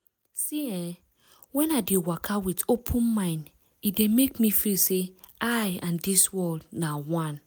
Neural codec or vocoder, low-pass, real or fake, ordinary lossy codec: none; none; real; none